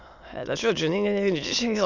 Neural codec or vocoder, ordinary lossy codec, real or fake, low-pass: autoencoder, 22.05 kHz, a latent of 192 numbers a frame, VITS, trained on many speakers; none; fake; 7.2 kHz